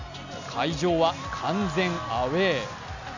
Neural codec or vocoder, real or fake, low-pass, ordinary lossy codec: none; real; 7.2 kHz; none